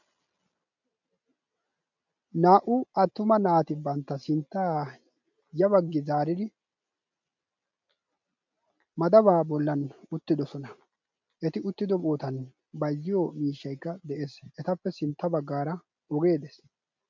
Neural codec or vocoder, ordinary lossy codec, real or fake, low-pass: none; MP3, 64 kbps; real; 7.2 kHz